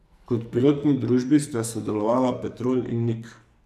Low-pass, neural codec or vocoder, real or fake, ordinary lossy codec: 14.4 kHz; codec, 44.1 kHz, 2.6 kbps, SNAC; fake; none